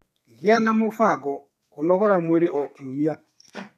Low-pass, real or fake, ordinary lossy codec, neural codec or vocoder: 14.4 kHz; fake; none; codec, 32 kHz, 1.9 kbps, SNAC